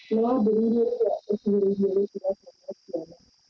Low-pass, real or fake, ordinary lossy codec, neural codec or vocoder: none; real; none; none